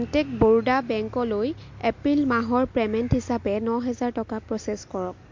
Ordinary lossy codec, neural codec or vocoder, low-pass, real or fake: MP3, 48 kbps; none; 7.2 kHz; real